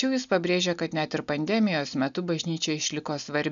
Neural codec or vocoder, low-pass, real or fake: none; 7.2 kHz; real